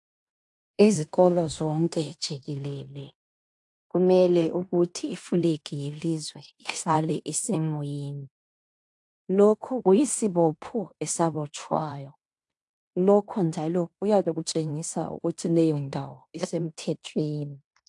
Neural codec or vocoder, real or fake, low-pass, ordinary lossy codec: codec, 16 kHz in and 24 kHz out, 0.9 kbps, LongCat-Audio-Codec, fine tuned four codebook decoder; fake; 10.8 kHz; AAC, 64 kbps